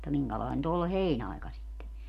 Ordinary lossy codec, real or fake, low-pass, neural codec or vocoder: none; real; 14.4 kHz; none